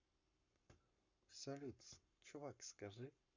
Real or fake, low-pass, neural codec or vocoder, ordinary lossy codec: fake; 7.2 kHz; codec, 44.1 kHz, 7.8 kbps, Pupu-Codec; MP3, 64 kbps